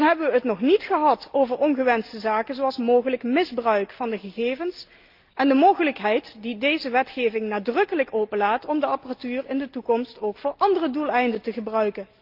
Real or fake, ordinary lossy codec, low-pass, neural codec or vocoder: real; Opus, 32 kbps; 5.4 kHz; none